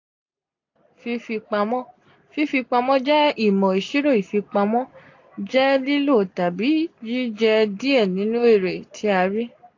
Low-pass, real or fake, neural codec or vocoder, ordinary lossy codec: 7.2 kHz; real; none; AAC, 48 kbps